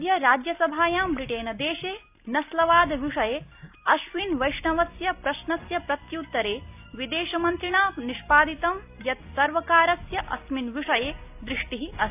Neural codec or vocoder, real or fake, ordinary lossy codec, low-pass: none; real; AAC, 32 kbps; 3.6 kHz